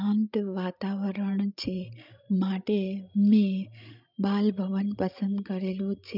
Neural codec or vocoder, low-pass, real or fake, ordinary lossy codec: codec, 16 kHz, 16 kbps, FreqCodec, larger model; 5.4 kHz; fake; none